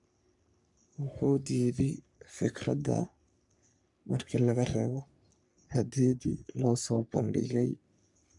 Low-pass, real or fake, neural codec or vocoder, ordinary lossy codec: 10.8 kHz; fake; codec, 44.1 kHz, 3.4 kbps, Pupu-Codec; none